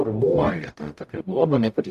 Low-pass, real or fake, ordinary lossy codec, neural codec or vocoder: 14.4 kHz; fake; AAC, 96 kbps; codec, 44.1 kHz, 0.9 kbps, DAC